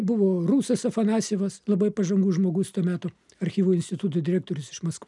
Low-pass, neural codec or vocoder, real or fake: 10.8 kHz; none; real